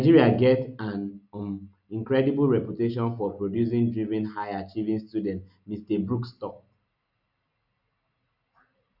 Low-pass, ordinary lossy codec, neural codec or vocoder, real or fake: 5.4 kHz; none; none; real